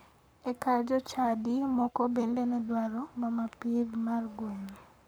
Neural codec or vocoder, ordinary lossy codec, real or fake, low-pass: codec, 44.1 kHz, 7.8 kbps, Pupu-Codec; none; fake; none